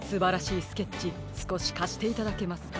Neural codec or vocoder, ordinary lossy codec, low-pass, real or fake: none; none; none; real